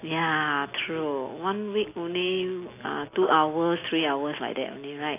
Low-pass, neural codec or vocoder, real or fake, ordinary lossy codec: 3.6 kHz; none; real; AAC, 24 kbps